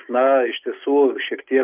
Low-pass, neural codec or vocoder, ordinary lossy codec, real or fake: 3.6 kHz; none; Opus, 24 kbps; real